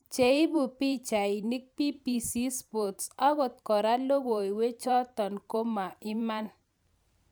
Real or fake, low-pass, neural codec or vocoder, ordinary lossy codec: fake; none; vocoder, 44.1 kHz, 128 mel bands every 512 samples, BigVGAN v2; none